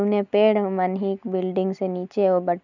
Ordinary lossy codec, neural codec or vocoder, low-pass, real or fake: none; none; 7.2 kHz; real